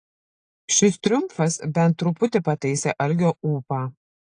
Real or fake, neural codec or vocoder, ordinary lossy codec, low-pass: real; none; AAC, 48 kbps; 9.9 kHz